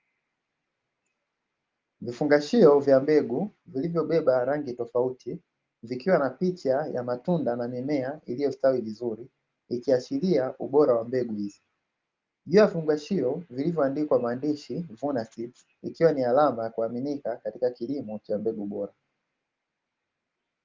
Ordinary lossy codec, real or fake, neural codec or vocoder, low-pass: Opus, 32 kbps; real; none; 7.2 kHz